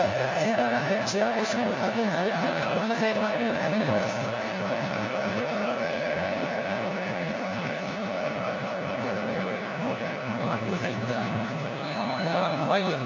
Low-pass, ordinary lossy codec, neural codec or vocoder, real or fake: 7.2 kHz; AAC, 48 kbps; codec, 16 kHz, 1 kbps, FunCodec, trained on LibriTTS, 50 frames a second; fake